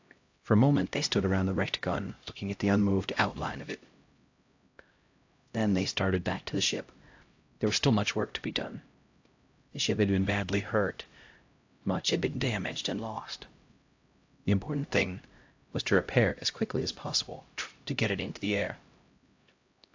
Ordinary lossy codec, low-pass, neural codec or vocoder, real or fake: AAC, 48 kbps; 7.2 kHz; codec, 16 kHz, 0.5 kbps, X-Codec, HuBERT features, trained on LibriSpeech; fake